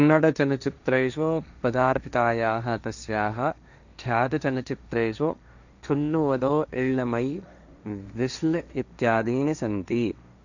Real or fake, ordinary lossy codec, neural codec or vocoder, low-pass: fake; none; codec, 16 kHz, 1.1 kbps, Voila-Tokenizer; 7.2 kHz